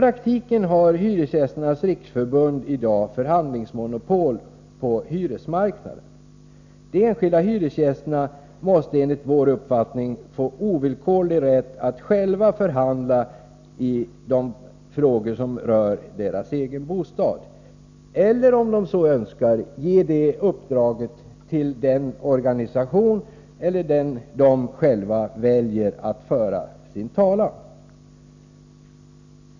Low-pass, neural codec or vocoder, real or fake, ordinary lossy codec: 7.2 kHz; none; real; none